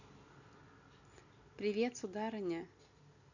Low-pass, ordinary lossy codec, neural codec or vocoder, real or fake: 7.2 kHz; none; none; real